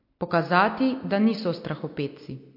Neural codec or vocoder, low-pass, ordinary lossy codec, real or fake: none; 5.4 kHz; MP3, 32 kbps; real